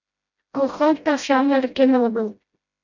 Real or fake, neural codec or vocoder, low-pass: fake; codec, 16 kHz, 0.5 kbps, FreqCodec, smaller model; 7.2 kHz